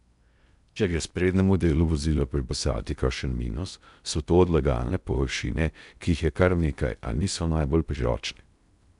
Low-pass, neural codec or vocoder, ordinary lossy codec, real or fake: 10.8 kHz; codec, 16 kHz in and 24 kHz out, 0.6 kbps, FocalCodec, streaming, 4096 codes; none; fake